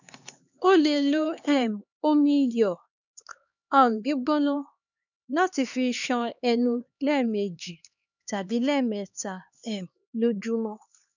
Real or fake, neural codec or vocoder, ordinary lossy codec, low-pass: fake; codec, 16 kHz, 2 kbps, X-Codec, HuBERT features, trained on LibriSpeech; none; 7.2 kHz